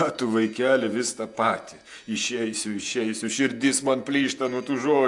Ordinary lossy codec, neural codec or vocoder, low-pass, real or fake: AAC, 64 kbps; vocoder, 48 kHz, 128 mel bands, Vocos; 10.8 kHz; fake